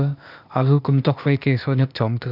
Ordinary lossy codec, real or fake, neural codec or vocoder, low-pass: none; fake; codec, 16 kHz, 0.8 kbps, ZipCodec; 5.4 kHz